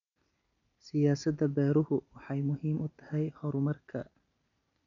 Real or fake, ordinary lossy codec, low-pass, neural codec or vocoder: real; none; 7.2 kHz; none